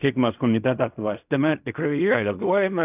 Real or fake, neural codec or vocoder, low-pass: fake; codec, 16 kHz in and 24 kHz out, 0.4 kbps, LongCat-Audio-Codec, fine tuned four codebook decoder; 3.6 kHz